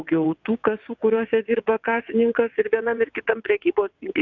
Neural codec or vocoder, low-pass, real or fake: vocoder, 22.05 kHz, 80 mel bands, WaveNeXt; 7.2 kHz; fake